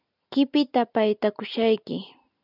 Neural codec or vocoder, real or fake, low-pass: none; real; 5.4 kHz